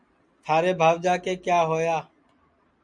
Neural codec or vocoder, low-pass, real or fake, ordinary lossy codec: none; 9.9 kHz; real; MP3, 96 kbps